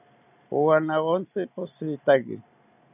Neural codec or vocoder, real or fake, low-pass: none; real; 3.6 kHz